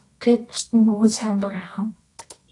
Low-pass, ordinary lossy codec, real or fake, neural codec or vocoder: 10.8 kHz; AAC, 48 kbps; fake; codec, 24 kHz, 0.9 kbps, WavTokenizer, medium music audio release